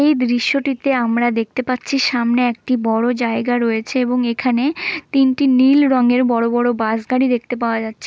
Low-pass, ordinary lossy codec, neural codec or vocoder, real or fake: none; none; none; real